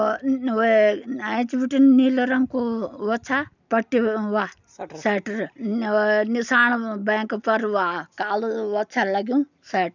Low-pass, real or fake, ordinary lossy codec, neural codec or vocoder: 7.2 kHz; real; none; none